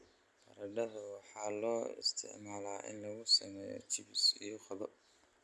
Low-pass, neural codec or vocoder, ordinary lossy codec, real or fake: none; none; none; real